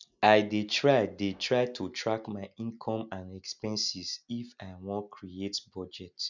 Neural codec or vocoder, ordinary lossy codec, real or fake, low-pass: none; none; real; 7.2 kHz